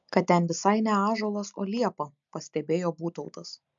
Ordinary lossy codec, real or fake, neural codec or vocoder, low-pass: MP3, 64 kbps; real; none; 7.2 kHz